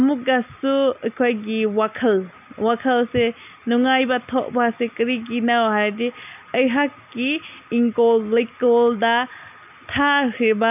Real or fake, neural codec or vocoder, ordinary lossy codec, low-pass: real; none; none; 3.6 kHz